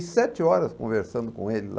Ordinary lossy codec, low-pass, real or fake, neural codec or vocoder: none; none; real; none